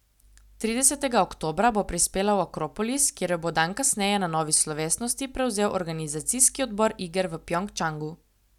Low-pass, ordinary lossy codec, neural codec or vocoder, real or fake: 19.8 kHz; none; none; real